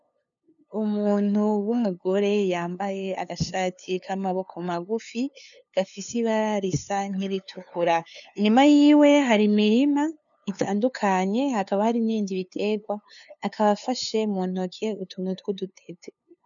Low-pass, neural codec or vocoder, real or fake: 7.2 kHz; codec, 16 kHz, 2 kbps, FunCodec, trained on LibriTTS, 25 frames a second; fake